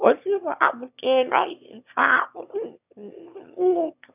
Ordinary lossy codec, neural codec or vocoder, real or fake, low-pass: none; autoencoder, 22.05 kHz, a latent of 192 numbers a frame, VITS, trained on one speaker; fake; 3.6 kHz